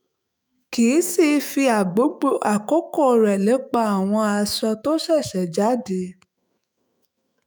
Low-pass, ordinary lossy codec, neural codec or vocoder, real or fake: none; none; autoencoder, 48 kHz, 128 numbers a frame, DAC-VAE, trained on Japanese speech; fake